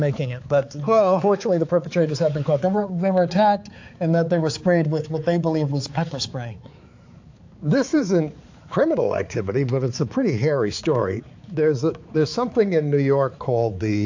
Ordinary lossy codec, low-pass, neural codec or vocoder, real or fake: AAC, 48 kbps; 7.2 kHz; codec, 16 kHz, 4 kbps, X-Codec, HuBERT features, trained on balanced general audio; fake